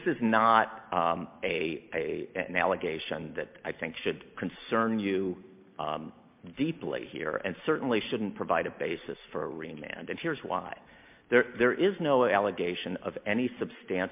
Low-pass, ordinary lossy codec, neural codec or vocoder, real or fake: 3.6 kHz; MP3, 32 kbps; none; real